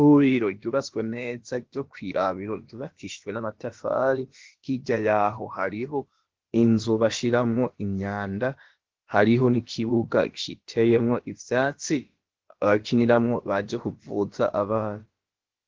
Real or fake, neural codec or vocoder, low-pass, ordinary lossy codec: fake; codec, 16 kHz, about 1 kbps, DyCAST, with the encoder's durations; 7.2 kHz; Opus, 16 kbps